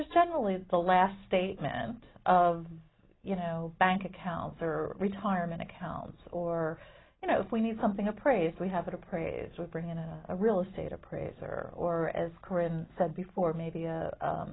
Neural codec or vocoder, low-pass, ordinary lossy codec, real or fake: none; 7.2 kHz; AAC, 16 kbps; real